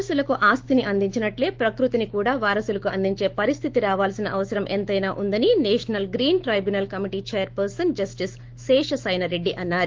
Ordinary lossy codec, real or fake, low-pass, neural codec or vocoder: Opus, 16 kbps; fake; 7.2 kHz; autoencoder, 48 kHz, 128 numbers a frame, DAC-VAE, trained on Japanese speech